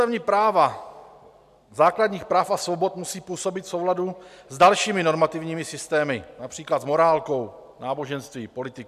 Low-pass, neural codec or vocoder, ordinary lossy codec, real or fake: 14.4 kHz; none; MP3, 96 kbps; real